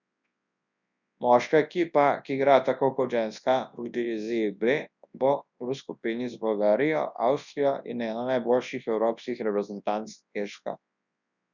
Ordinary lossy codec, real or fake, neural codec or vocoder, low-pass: none; fake; codec, 24 kHz, 0.9 kbps, WavTokenizer, large speech release; 7.2 kHz